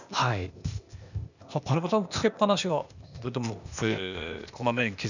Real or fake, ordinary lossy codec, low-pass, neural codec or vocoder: fake; none; 7.2 kHz; codec, 16 kHz, 0.8 kbps, ZipCodec